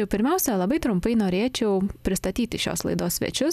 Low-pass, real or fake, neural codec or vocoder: 14.4 kHz; real; none